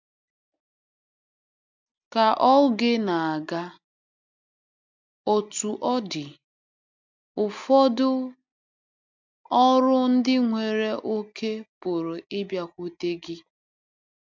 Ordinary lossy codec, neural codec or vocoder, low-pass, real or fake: MP3, 64 kbps; none; 7.2 kHz; real